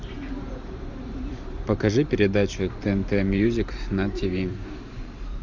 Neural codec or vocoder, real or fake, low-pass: vocoder, 44.1 kHz, 128 mel bands every 512 samples, BigVGAN v2; fake; 7.2 kHz